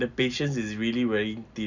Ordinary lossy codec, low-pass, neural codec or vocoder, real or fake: none; 7.2 kHz; none; real